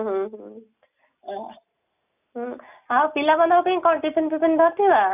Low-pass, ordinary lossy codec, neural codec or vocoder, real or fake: 3.6 kHz; none; none; real